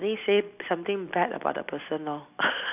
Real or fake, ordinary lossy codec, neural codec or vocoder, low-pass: real; none; none; 3.6 kHz